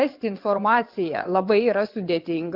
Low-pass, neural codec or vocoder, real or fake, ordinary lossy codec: 5.4 kHz; vocoder, 22.05 kHz, 80 mel bands, WaveNeXt; fake; Opus, 32 kbps